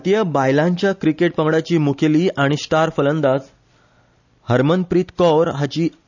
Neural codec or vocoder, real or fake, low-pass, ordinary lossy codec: none; real; 7.2 kHz; none